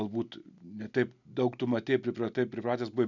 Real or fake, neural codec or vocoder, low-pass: real; none; 7.2 kHz